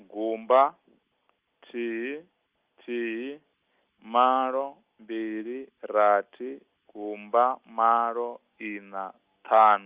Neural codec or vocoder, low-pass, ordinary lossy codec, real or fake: none; 3.6 kHz; Opus, 24 kbps; real